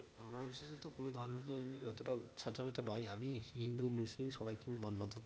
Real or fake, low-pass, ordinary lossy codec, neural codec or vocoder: fake; none; none; codec, 16 kHz, 0.8 kbps, ZipCodec